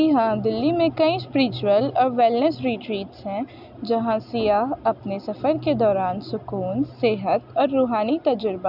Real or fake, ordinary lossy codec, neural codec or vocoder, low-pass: real; none; none; 5.4 kHz